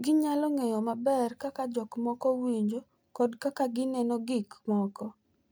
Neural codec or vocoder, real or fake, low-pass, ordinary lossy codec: none; real; none; none